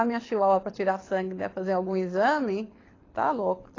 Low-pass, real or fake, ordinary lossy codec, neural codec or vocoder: 7.2 kHz; fake; AAC, 32 kbps; codec, 24 kHz, 6 kbps, HILCodec